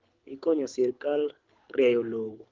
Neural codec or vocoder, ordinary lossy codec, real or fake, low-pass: codec, 24 kHz, 6 kbps, HILCodec; Opus, 32 kbps; fake; 7.2 kHz